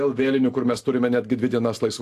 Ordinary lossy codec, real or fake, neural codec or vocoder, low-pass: AAC, 64 kbps; real; none; 14.4 kHz